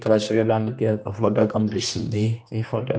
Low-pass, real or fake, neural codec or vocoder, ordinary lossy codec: none; fake; codec, 16 kHz, 1 kbps, X-Codec, HuBERT features, trained on general audio; none